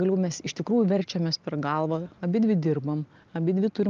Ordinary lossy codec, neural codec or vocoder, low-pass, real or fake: Opus, 32 kbps; none; 7.2 kHz; real